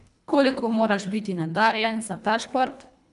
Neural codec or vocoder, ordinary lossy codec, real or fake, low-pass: codec, 24 kHz, 1.5 kbps, HILCodec; none; fake; 10.8 kHz